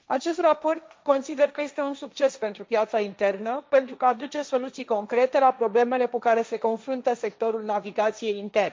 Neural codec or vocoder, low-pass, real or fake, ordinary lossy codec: codec, 16 kHz, 1.1 kbps, Voila-Tokenizer; none; fake; none